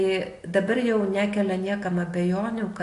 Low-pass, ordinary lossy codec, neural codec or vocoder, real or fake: 10.8 kHz; AAC, 48 kbps; none; real